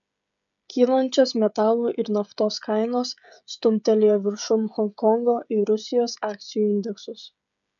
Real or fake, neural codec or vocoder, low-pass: fake; codec, 16 kHz, 16 kbps, FreqCodec, smaller model; 7.2 kHz